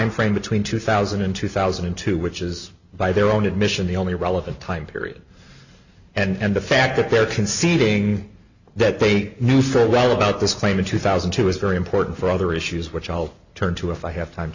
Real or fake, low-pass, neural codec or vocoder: real; 7.2 kHz; none